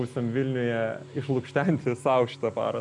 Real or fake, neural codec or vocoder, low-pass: real; none; 10.8 kHz